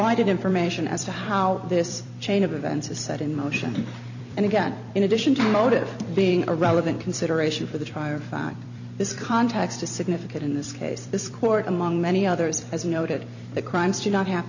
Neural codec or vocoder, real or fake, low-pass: vocoder, 44.1 kHz, 128 mel bands every 256 samples, BigVGAN v2; fake; 7.2 kHz